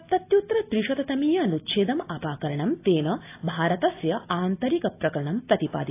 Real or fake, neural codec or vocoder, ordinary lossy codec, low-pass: real; none; AAC, 24 kbps; 3.6 kHz